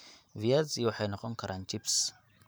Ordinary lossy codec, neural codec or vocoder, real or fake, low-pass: none; vocoder, 44.1 kHz, 128 mel bands every 512 samples, BigVGAN v2; fake; none